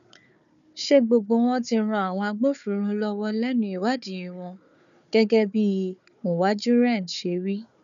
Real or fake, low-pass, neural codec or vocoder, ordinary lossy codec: fake; 7.2 kHz; codec, 16 kHz, 4 kbps, FunCodec, trained on Chinese and English, 50 frames a second; none